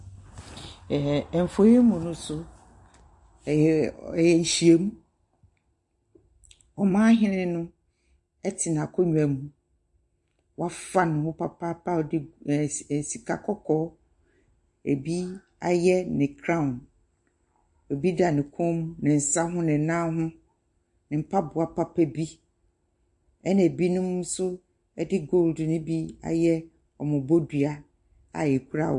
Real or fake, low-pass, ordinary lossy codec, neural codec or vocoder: real; 10.8 kHz; MP3, 48 kbps; none